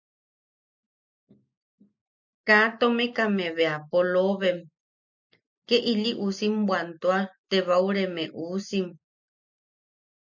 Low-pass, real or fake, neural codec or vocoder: 7.2 kHz; real; none